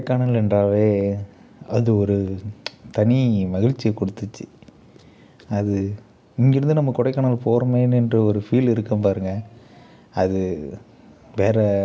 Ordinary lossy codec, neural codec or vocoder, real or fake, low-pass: none; none; real; none